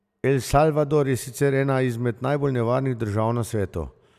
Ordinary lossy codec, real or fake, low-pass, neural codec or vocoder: none; real; 14.4 kHz; none